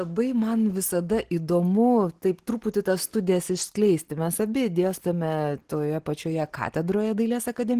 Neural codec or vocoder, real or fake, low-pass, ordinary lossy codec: none; real; 14.4 kHz; Opus, 16 kbps